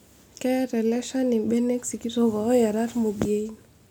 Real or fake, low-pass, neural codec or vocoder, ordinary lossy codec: real; none; none; none